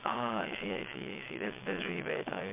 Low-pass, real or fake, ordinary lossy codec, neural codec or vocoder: 3.6 kHz; fake; MP3, 32 kbps; vocoder, 22.05 kHz, 80 mel bands, WaveNeXt